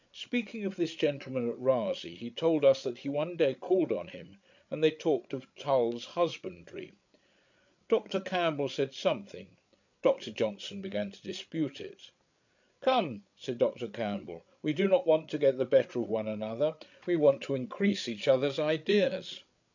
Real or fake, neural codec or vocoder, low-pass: fake; codec, 16 kHz, 8 kbps, FreqCodec, larger model; 7.2 kHz